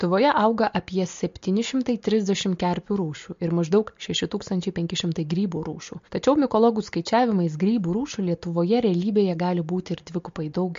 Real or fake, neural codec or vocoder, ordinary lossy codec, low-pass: real; none; MP3, 48 kbps; 7.2 kHz